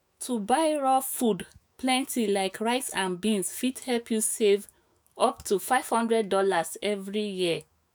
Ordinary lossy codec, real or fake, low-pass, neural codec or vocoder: none; fake; none; autoencoder, 48 kHz, 128 numbers a frame, DAC-VAE, trained on Japanese speech